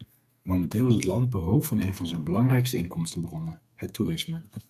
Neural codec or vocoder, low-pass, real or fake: codec, 32 kHz, 1.9 kbps, SNAC; 14.4 kHz; fake